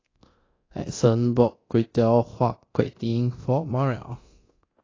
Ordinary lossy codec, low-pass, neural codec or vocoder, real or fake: AAC, 32 kbps; 7.2 kHz; codec, 24 kHz, 0.9 kbps, DualCodec; fake